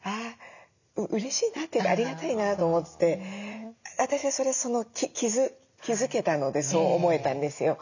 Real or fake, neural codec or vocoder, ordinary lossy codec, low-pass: real; none; none; 7.2 kHz